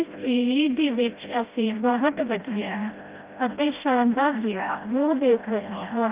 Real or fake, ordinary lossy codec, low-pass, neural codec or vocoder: fake; Opus, 32 kbps; 3.6 kHz; codec, 16 kHz, 0.5 kbps, FreqCodec, smaller model